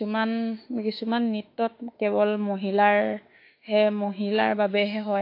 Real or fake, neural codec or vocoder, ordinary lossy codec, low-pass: fake; autoencoder, 48 kHz, 32 numbers a frame, DAC-VAE, trained on Japanese speech; AAC, 32 kbps; 5.4 kHz